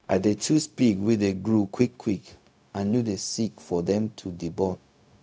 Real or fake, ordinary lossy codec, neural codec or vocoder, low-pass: fake; none; codec, 16 kHz, 0.4 kbps, LongCat-Audio-Codec; none